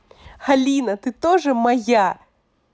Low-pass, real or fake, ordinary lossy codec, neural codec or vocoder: none; real; none; none